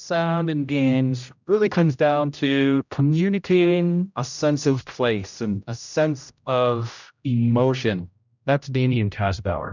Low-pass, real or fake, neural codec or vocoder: 7.2 kHz; fake; codec, 16 kHz, 0.5 kbps, X-Codec, HuBERT features, trained on general audio